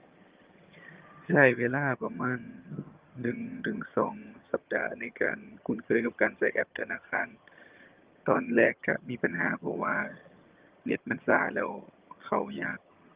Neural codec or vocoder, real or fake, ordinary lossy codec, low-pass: vocoder, 22.05 kHz, 80 mel bands, HiFi-GAN; fake; Opus, 32 kbps; 3.6 kHz